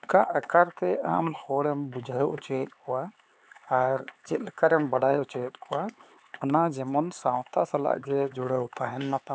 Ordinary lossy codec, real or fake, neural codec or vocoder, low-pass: none; fake; codec, 16 kHz, 4 kbps, X-Codec, HuBERT features, trained on LibriSpeech; none